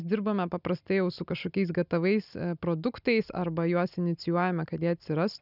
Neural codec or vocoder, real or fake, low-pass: none; real; 5.4 kHz